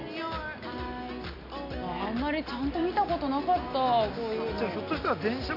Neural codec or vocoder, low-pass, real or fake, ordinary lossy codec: none; 5.4 kHz; real; none